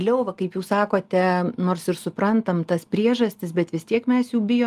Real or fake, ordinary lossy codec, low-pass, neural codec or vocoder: real; Opus, 32 kbps; 14.4 kHz; none